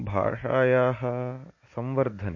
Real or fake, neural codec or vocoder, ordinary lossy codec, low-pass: real; none; MP3, 32 kbps; 7.2 kHz